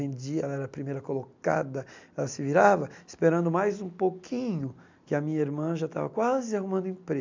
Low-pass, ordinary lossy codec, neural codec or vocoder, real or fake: 7.2 kHz; MP3, 64 kbps; none; real